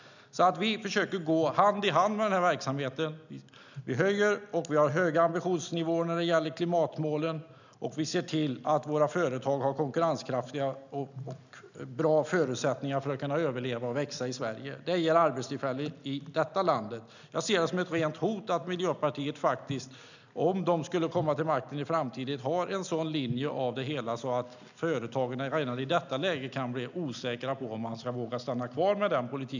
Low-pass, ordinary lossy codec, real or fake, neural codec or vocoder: 7.2 kHz; none; real; none